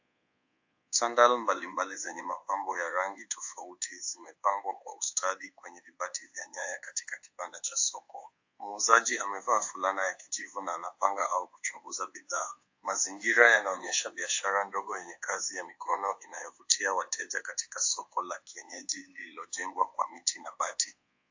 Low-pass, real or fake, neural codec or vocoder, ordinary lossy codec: 7.2 kHz; fake; codec, 24 kHz, 1.2 kbps, DualCodec; AAC, 48 kbps